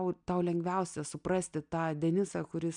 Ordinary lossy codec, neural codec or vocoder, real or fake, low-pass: MP3, 96 kbps; none; real; 9.9 kHz